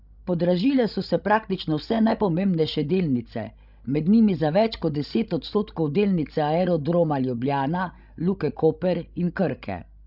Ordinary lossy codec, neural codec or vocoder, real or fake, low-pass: none; codec, 16 kHz, 16 kbps, FreqCodec, larger model; fake; 5.4 kHz